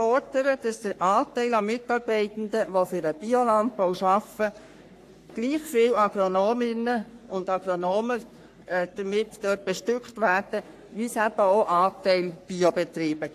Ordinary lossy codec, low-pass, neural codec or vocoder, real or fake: AAC, 64 kbps; 14.4 kHz; codec, 44.1 kHz, 3.4 kbps, Pupu-Codec; fake